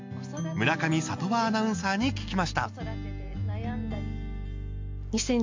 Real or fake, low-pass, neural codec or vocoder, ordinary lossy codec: real; 7.2 kHz; none; MP3, 48 kbps